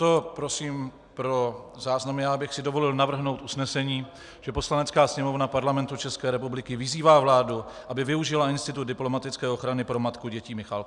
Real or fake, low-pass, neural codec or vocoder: real; 10.8 kHz; none